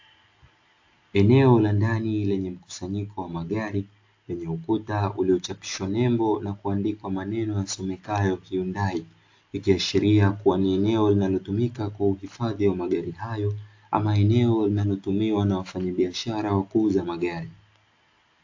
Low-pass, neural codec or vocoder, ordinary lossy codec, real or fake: 7.2 kHz; none; AAC, 48 kbps; real